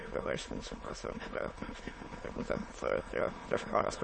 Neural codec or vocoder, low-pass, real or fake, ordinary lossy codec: autoencoder, 22.05 kHz, a latent of 192 numbers a frame, VITS, trained on many speakers; 9.9 kHz; fake; MP3, 32 kbps